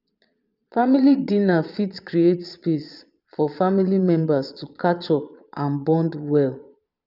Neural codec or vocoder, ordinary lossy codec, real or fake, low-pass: vocoder, 22.05 kHz, 80 mel bands, Vocos; none; fake; 5.4 kHz